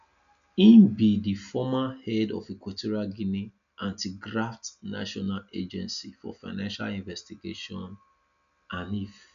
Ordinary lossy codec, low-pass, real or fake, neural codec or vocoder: none; 7.2 kHz; real; none